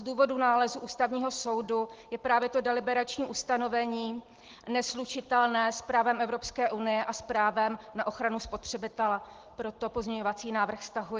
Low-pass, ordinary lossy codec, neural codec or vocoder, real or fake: 7.2 kHz; Opus, 16 kbps; none; real